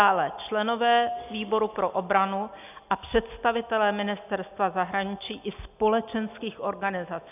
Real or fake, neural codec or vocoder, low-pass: real; none; 3.6 kHz